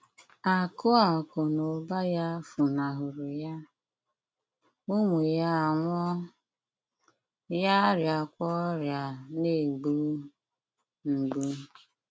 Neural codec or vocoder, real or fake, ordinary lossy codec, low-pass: none; real; none; none